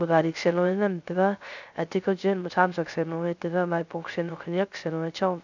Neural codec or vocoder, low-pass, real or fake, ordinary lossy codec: codec, 16 kHz, 0.3 kbps, FocalCodec; 7.2 kHz; fake; none